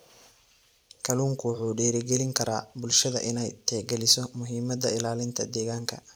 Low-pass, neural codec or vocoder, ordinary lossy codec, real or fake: none; vocoder, 44.1 kHz, 128 mel bands every 256 samples, BigVGAN v2; none; fake